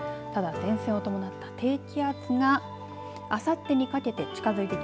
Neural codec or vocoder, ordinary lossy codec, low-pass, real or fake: none; none; none; real